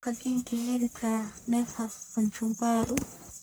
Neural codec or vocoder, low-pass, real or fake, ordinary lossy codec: codec, 44.1 kHz, 1.7 kbps, Pupu-Codec; none; fake; none